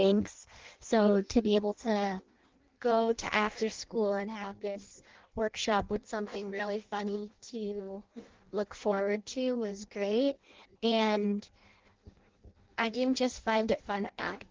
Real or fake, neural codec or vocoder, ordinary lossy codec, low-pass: fake; codec, 16 kHz in and 24 kHz out, 0.6 kbps, FireRedTTS-2 codec; Opus, 16 kbps; 7.2 kHz